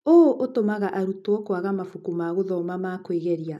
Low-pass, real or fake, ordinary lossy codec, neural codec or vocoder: 14.4 kHz; real; none; none